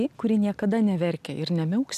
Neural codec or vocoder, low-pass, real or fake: none; 14.4 kHz; real